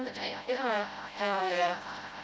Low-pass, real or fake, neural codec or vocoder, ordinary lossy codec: none; fake; codec, 16 kHz, 0.5 kbps, FreqCodec, smaller model; none